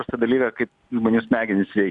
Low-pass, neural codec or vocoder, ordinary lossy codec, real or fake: 10.8 kHz; none; MP3, 96 kbps; real